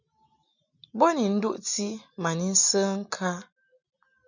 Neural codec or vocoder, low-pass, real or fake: none; 7.2 kHz; real